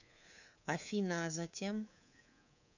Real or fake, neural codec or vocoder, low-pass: fake; codec, 24 kHz, 3.1 kbps, DualCodec; 7.2 kHz